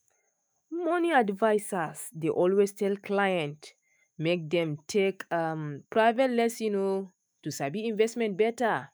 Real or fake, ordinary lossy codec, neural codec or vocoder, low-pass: fake; none; autoencoder, 48 kHz, 128 numbers a frame, DAC-VAE, trained on Japanese speech; none